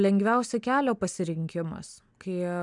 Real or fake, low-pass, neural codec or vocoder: real; 10.8 kHz; none